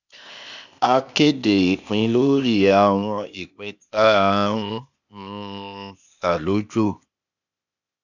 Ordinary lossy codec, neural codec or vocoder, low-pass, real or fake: none; codec, 16 kHz, 0.8 kbps, ZipCodec; 7.2 kHz; fake